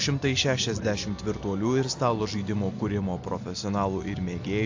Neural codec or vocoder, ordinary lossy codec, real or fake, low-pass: none; AAC, 48 kbps; real; 7.2 kHz